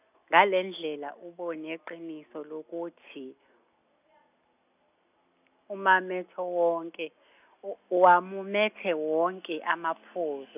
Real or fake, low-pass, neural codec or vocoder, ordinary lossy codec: real; 3.6 kHz; none; none